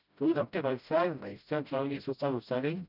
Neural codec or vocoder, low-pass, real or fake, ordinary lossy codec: codec, 16 kHz, 0.5 kbps, FreqCodec, smaller model; 5.4 kHz; fake; none